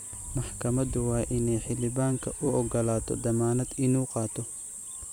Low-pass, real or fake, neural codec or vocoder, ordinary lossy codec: none; fake; vocoder, 44.1 kHz, 128 mel bands every 256 samples, BigVGAN v2; none